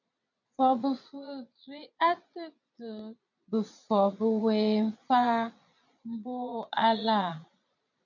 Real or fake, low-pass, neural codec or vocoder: fake; 7.2 kHz; vocoder, 22.05 kHz, 80 mel bands, Vocos